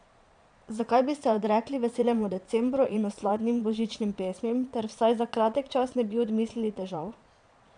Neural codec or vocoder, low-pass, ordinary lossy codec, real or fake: vocoder, 22.05 kHz, 80 mel bands, Vocos; 9.9 kHz; none; fake